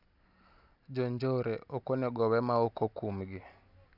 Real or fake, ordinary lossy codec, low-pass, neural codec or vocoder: real; none; 5.4 kHz; none